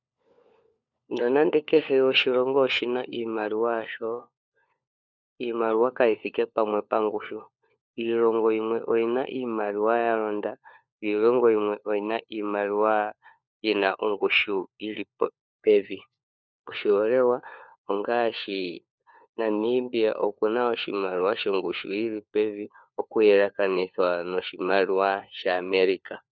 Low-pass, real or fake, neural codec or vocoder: 7.2 kHz; fake; codec, 16 kHz, 4 kbps, FunCodec, trained on LibriTTS, 50 frames a second